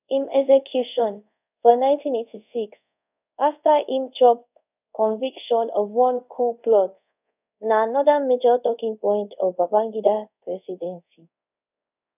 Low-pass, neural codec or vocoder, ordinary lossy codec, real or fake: 3.6 kHz; codec, 24 kHz, 0.5 kbps, DualCodec; none; fake